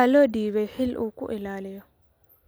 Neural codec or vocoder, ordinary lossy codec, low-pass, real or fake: none; none; none; real